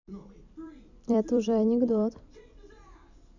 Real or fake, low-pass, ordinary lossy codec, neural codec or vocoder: fake; 7.2 kHz; none; vocoder, 44.1 kHz, 128 mel bands every 256 samples, BigVGAN v2